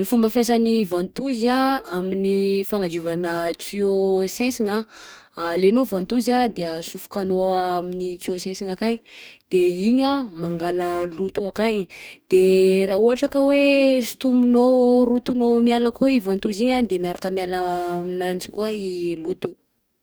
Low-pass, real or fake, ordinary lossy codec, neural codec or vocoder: none; fake; none; codec, 44.1 kHz, 2.6 kbps, DAC